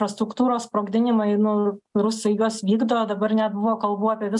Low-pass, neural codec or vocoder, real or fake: 10.8 kHz; none; real